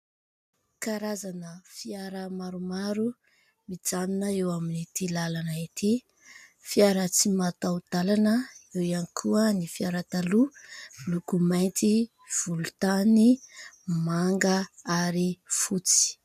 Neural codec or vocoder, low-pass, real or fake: none; 14.4 kHz; real